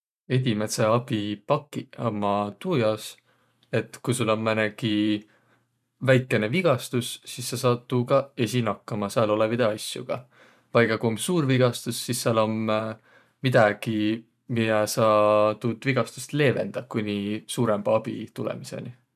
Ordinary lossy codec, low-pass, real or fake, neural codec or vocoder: none; 14.4 kHz; fake; vocoder, 44.1 kHz, 128 mel bands every 512 samples, BigVGAN v2